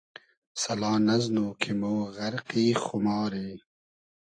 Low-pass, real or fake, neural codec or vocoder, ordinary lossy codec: 9.9 kHz; real; none; AAC, 48 kbps